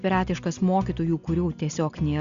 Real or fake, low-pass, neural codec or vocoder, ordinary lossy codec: real; 7.2 kHz; none; Opus, 64 kbps